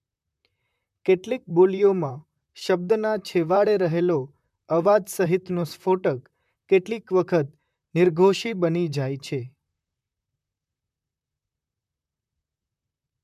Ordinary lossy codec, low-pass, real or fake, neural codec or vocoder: MP3, 96 kbps; 14.4 kHz; fake; vocoder, 44.1 kHz, 128 mel bands, Pupu-Vocoder